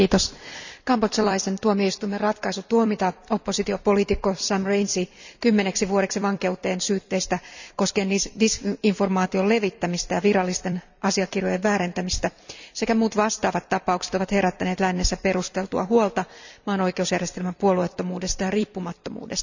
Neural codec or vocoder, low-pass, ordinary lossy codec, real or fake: vocoder, 44.1 kHz, 128 mel bands every 256 samples, BigVGAN v2; 7.2 kHz; none; fake